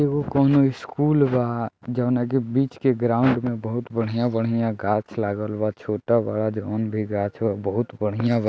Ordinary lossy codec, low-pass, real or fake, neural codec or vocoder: none; none; real; none